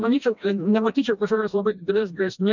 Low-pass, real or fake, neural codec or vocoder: 7.2 kHz; fake; codec, 16 kHz, 1 kbps, FreqCodec, smaller model